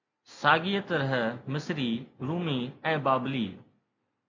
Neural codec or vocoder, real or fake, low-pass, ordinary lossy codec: none; real; 7.2 kHz; MP3, 64 kbps